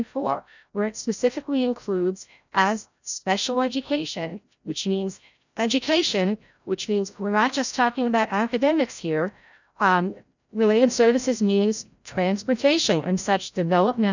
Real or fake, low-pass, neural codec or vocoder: fake; 7.2 kHz; codec, 16 kHz, 0.5 kbps, FreqCodec, larger model